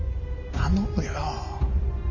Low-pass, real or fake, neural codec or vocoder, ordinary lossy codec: 7.2 kHz; real; none; none